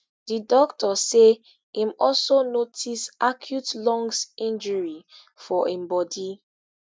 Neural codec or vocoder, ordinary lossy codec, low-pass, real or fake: none; none; none; real